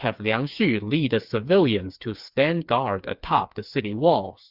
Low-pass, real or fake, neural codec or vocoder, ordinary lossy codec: 5.4 kHz; fake; codec, 16 kHz, 4 kbps, FreqCodec, smaller model; Opus, 64 kbps